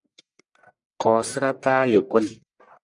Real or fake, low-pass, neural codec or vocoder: fake; 10.8 kHz; codec, 44.1 kHz, 1.7 kbps, Pupu-Codec